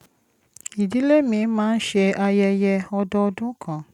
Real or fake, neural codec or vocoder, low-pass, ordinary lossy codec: real; none; 19.8 kHz; none